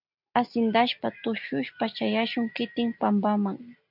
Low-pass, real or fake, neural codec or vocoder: 5.4 kHz; real; none